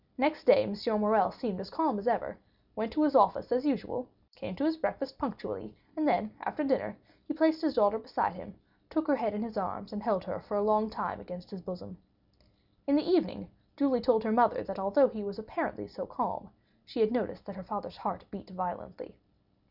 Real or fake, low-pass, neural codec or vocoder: real; 5.4 kHz; none